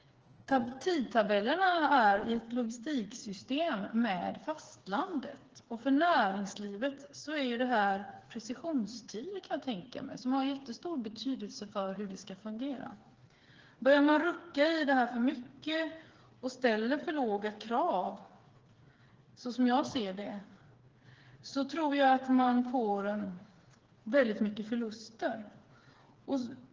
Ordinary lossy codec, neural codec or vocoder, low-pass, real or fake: Opus, 16 kbps; codec, 16 kHz, 4 kbps, FreqCodec, smaller model; 7.2 kHz; fake